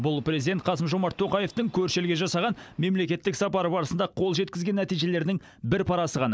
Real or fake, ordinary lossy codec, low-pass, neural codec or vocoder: real; none; none; none